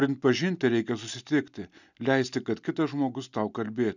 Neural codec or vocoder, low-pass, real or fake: none; 7.2 kHz; real